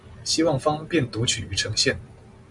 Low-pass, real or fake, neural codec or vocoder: 10.8 kHz; real; none